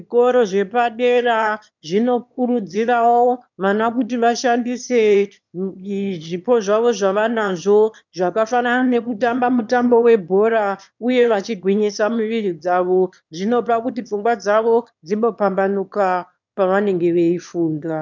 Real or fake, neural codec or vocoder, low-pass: fake; autoencoder, 22.05 kHz, a latent of 192 numbers a frame, VITS, trained on one speaker; 7.2 kHz